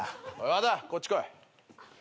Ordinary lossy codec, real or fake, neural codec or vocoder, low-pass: none; real; none; none